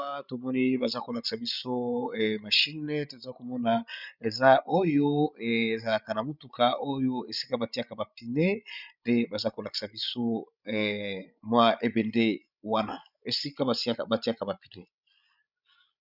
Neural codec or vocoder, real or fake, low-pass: codec, 16 kHz, 16 kbps, FreqCodec, larger model; fake; 5.4 kHz